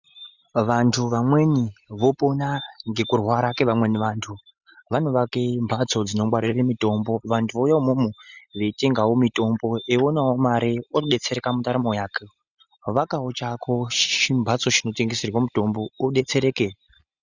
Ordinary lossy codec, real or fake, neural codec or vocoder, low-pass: Opus, 64 kbps; real; none; 7.2 kHz